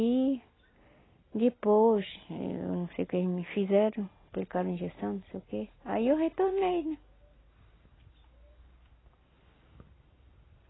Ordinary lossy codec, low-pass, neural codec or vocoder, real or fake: AAC, 16 kbps; 7.2 kHz; none; real